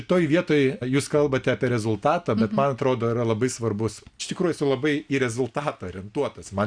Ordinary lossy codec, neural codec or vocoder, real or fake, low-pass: Opus, 64 kbps; none; real; 9.9 kHz